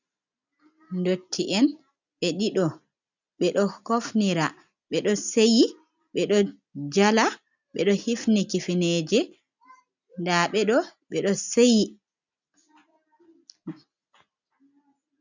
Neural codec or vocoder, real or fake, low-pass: none; real; 7.2 kHz